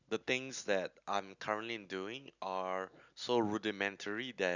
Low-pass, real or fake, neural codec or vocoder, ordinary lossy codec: 7.2 kHz; real; none; none